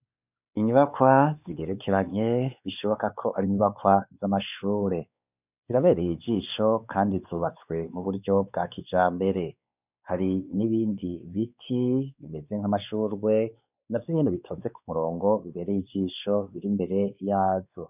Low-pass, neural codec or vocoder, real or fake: 3.6 kHz; codec, 16 kHz, 4 kbps, X-Codec, WavLM features, trained on Multilingual LibriSpeech; fake